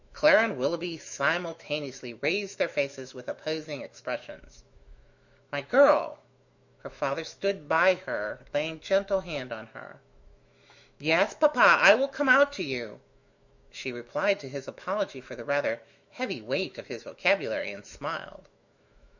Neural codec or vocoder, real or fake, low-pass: autoencoder, 48 kHz, 128 numbers a frame, DAC-VAE, trained on Japanese speech; fake; 7.2 kHz